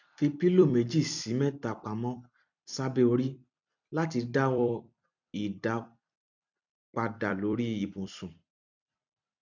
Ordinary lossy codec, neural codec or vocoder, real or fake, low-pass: none; vocoder, 44.1 kHz, 128 mel bands every 256 samples, BigVGAN v2; fake; 7.2 kHz